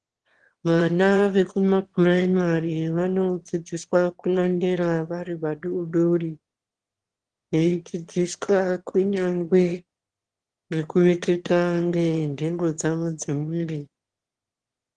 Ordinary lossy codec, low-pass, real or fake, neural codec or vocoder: Opus, 16 kbps; 9.9 kHz; fake; autoencoder, 22.05 kHz, a latent of 192 numbers a frame, VITS, trained on one speaker